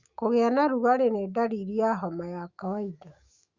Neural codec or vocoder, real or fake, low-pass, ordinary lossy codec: autoencoder, 48 kHz, 128 numbers a frame, DAC-VAE, trained on Japanese speech; fake; 7.2 kHz; Opus, 64 kbps